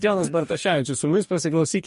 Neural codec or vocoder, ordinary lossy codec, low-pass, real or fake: codec, 44.1 kHz, 2.6 kbps, DAC; MP3, 48 kbps; 14.4 kHz; fake